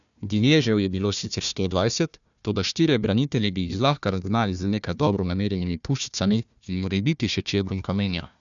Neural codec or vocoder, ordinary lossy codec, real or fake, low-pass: codec, 16 kHz, 1 kbps, FunCodec, trained on Chinese and English, 50 frames a second; none; fake; 7.2 kHz